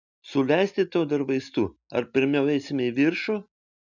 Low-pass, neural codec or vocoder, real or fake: 7.2 kHz; none; real